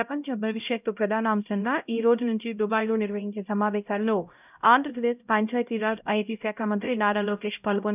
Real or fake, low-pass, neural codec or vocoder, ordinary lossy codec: fake; 3.6 kHz; codec, 16 kHz, 0.5 kbps, X-Codec, HuBERT features, trained on LibriSpeech; none